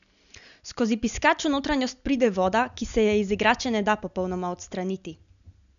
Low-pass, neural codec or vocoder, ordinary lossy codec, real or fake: 7.2 kHz; none; none; real